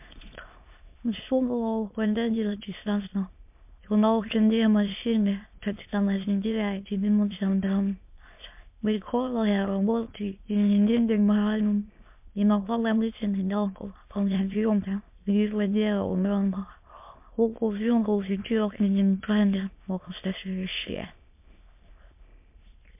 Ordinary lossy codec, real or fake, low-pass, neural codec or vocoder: MP3, 32 kbps; fake; 3.6 kHz; autoencoder, 22.05 kHz, a latent of 192 numbers a frame, VITS, trained on many speakers